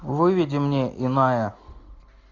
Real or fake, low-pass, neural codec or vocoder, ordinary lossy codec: real; 7.2 kHz; none; AAC, 48 kbps